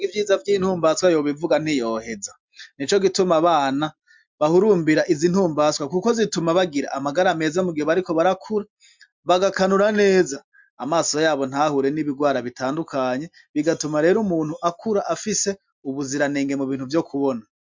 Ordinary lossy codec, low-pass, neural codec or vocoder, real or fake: MP3, 64 kbps; 7.2 kHz; none; real